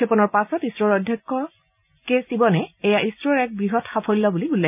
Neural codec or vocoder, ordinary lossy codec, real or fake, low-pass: none; none; real; 3.6 kHz